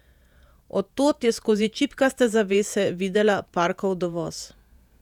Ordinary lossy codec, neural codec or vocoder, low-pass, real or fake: none; vocoder, 48 kHz, 128 mel bands, Vocos; 19.8 kHz; fake